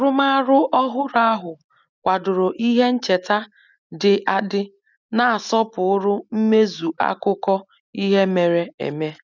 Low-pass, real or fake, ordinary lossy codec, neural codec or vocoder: 7.2 kHz; real; none; none